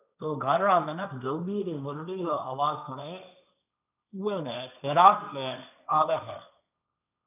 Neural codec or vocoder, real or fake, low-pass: codec, 16 kHz, 1.1 kbps, Voila-Tokenizer; fake; 3.6 kHz